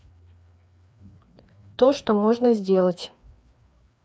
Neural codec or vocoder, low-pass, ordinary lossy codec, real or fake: codec, 16 kHz, 2 kbps, FreqCodec, larger model; none; none; fake